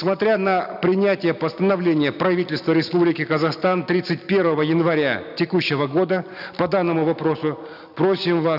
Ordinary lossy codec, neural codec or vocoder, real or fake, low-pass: none; none; real; 5.4 kHz